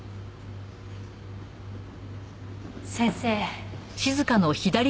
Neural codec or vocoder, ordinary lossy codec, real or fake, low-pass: none; none; real; none